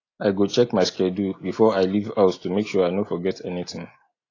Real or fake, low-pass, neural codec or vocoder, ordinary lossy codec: real; 7.2 kHz; none; AAC, 32 kbps